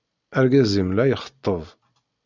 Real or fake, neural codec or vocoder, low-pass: real; none; 7.2 kHz